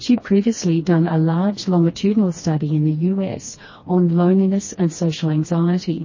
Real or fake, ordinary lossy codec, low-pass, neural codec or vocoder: fake; MP3, 32 kbps; 7.2 kHz; codec, 16 kHz, 2 kbps, FreqCodec, smaller model